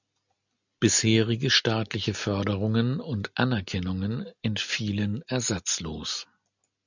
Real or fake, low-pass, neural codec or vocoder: real; 7.2 kHz; none